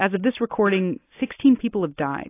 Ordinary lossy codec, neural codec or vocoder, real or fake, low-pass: AAC, 24 kbps; none; real; 3.6 kHz